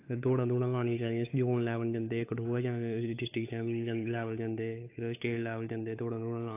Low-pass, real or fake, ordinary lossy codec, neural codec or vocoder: 3.6 kHz; fake; AAC, 24 kbps; codec, 16 kHz, 8 kbps, FunCodec, trained on Chinese and English, 25 frames a second